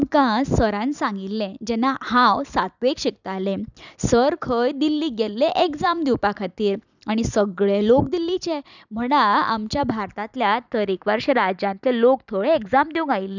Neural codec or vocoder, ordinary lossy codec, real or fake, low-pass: vocoder, 44.1 kHz, 128 mel bands every 256 samples, BigVGAN v2; none; fake; 7.2 kHz